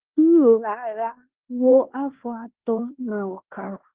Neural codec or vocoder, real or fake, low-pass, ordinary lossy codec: codec, 16 kHz in and 24 kHz out, 0.9 kbps, LongCat-Audio-Codec, fine tuned four codebook decoder; fake; 3.6 kHz; Opus, 16 kbps